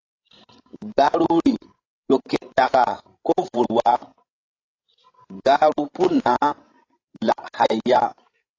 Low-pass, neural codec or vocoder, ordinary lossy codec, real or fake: 7.2 kHz; codec, 16 kHz, 16 kbps, FreqCodec, larger model; AAC, 32 kbps; fake